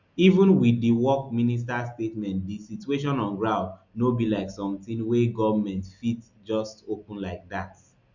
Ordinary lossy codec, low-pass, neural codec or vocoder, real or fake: none; 7.2 kHz; none; real